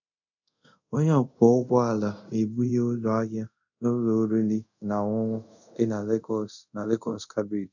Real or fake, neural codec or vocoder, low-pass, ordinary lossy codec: fake; codec, 24 kHz, 0.5 kbps, DualCodec; 7.2 kHz; none